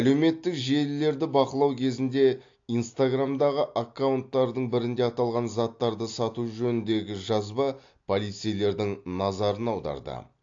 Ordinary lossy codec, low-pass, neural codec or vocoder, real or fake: AAC, 48 kbps; 7.2 kHz; none; real